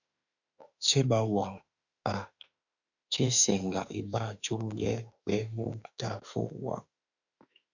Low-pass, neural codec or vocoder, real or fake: 7.2 kHz; autoencoder, 48 kHz, 32 numbers a frame, DAC-VAE, trained on Japanese speech; fake